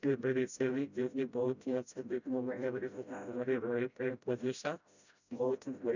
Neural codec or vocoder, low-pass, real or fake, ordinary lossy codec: codec, 16 kHz, 0.5 kbps, FreqCodec, smaller model; 7.2 kHz; fake; none